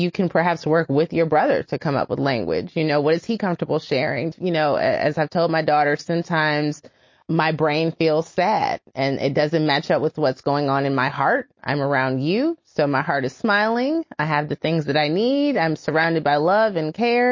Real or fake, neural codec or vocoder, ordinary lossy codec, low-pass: real; none; MP3, 32 kbps; 7.2 kHz